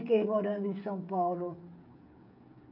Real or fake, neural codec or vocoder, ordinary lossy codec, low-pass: fake; codec, 16 kHz, 8 kbps, FreqCodec, smaller model; none; 5.4 kHz